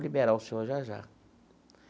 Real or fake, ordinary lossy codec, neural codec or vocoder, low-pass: real; none; none; none